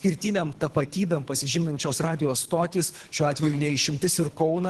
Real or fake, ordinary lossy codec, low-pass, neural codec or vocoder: fake; Opus, 16 kbps; 10.8 kHz; codec, 24 kHz, 3 kbps, HILCodec